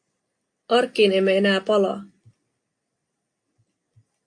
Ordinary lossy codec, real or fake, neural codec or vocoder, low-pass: AAC, 48 kbps; real; none; 9.9 kHz